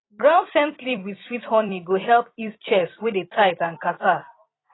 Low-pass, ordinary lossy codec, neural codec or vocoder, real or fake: 7.2 kHz; AAC, 16 kbps; vocoder, 44.1 kHz, 128 mel bands every 256 samples, BigVGAN v2; fake